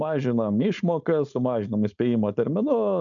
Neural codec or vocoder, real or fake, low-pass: codec, 16 kHz, 4.8 kbps, FACodec; fake; 7.2 kHz